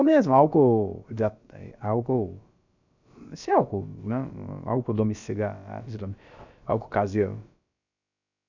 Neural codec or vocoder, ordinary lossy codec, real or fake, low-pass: codec, 16 kHz, about 1 kbps, DyCAST, with the encoder's durations; none; fake; 7.2 kHz